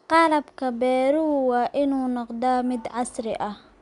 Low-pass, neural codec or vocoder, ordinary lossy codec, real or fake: 10.8 kHz; none; none; real